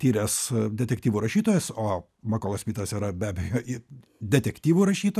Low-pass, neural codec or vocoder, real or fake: 14.4 kHz; none; real